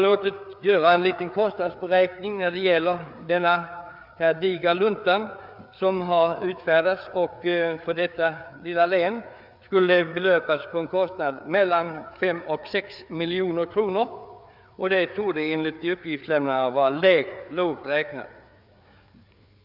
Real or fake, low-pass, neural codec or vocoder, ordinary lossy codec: fake; 5.4 kHz; codec, 16 kHz, 4 kbps, FreqCodec, larger model; none